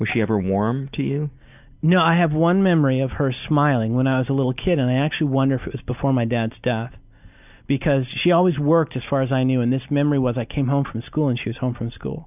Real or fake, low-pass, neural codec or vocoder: real; 3.6 kHz; none